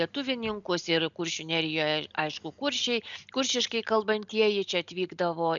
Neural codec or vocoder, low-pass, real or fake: none; 7.2 kHz; real